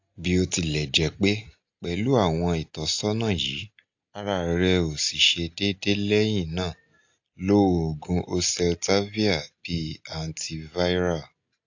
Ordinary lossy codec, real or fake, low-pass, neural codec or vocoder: AAC, 48 kbps; real; 7.2 kHz; none